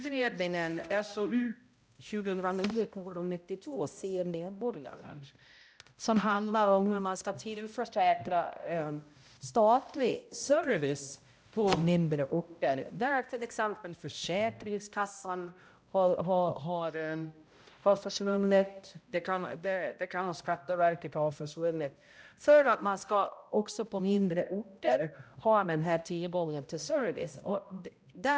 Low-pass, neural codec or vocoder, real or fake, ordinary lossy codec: none; codec, 16 kHz, 0.5 kbps, X-Codec, HuBERT features, trained on balanced general audio; fake; none